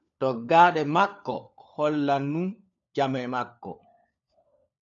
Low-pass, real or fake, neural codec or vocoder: 7.2 kHz; fake; codec, 16 kHz, 4 kbps, FunCodec, trained on LibriTTS, 50 frames a second